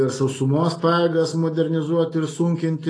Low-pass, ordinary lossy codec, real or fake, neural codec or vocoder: 9.9 kHz; AAC, 32 kbps; real; none